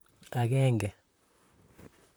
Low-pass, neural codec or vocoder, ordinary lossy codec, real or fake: none; vocoder, 44.1 kHz, 128 mel bands, Pupu-Vocoder; none; fake